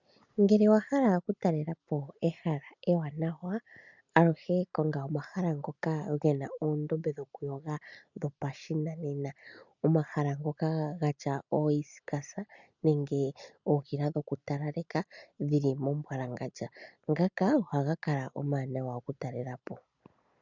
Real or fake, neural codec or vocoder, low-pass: real; none; 7.2 kHz